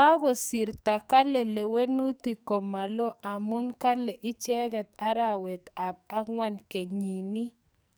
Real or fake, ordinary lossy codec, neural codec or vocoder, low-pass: fake; none; codec, 44.1 kHz, 2.6 kbps, SNAC; none